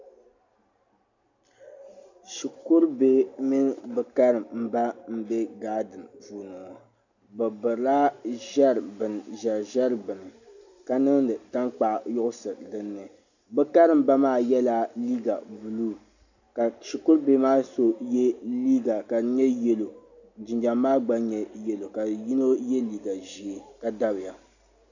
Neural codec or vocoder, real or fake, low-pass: none; real; 7.2 kHz